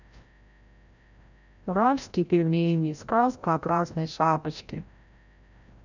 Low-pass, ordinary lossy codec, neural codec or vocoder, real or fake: 7.2 kHz; none; codec, 16 kHz, 0.5 kbps, FreqCodec, larger model; fake